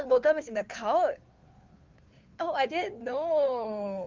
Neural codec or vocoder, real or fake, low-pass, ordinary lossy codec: codec, 16 kHz, 2 kbps, FunCodec, trained on Chinese and English, 25 frames a second; fake; 7.2 kHz; Opus, 32 kbps